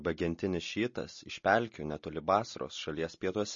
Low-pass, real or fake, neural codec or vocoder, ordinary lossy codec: 7.2 kHz; real; none; MP3, 32 kbps